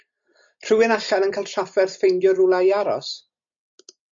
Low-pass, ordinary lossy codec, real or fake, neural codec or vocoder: 7.2 kHz; MP3, 48 kbps; real; none